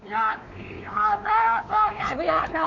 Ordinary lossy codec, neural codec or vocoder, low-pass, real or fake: none; codec, 16 kHz, 2 kbps, X-Codec, WavLM features, trained on Multilingual LibriSpeech; 7.2 kHz; fake